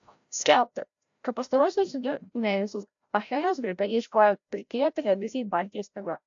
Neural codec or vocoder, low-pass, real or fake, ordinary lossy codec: codec, 16 kHz, 0.5 kbps, FreqCodec, larger model; 7.2 kHz; fake; AAC, 64 kbps